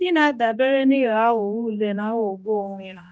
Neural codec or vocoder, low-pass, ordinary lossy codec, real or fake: codec, 16 kHz, 1 kbps, X-Codec, HuBERT features, trained on general audio; none; none; fake